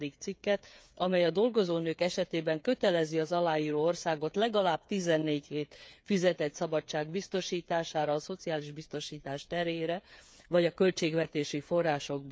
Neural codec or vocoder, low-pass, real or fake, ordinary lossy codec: codec, 16 kHz, 8 kbps, FreqCodec, smaller model; none; fake; none